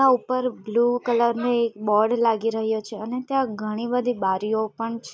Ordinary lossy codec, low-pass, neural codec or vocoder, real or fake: none; none; none; real